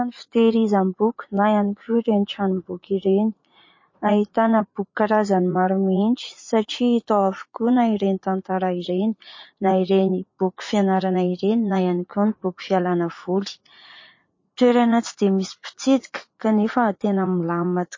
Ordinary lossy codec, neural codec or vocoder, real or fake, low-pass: MP3, 32 kbps; vocoder, 44.1 kHz, 80 mel bands, Vocos; fake; 7.2 kHz